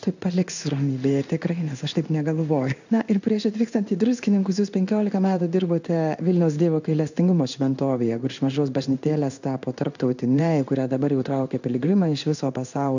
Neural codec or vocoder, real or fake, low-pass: codec, 16 kHz in and 24 kHz out, 1 kbps, XY-Tokenizer; fake; 7.2 kHz